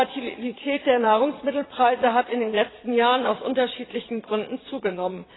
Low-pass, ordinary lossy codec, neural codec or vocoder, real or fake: 7.2 kHz; AAC, 16 kbps; vocoder, 22.05 kHz, 80 mel bands, Vocos; fake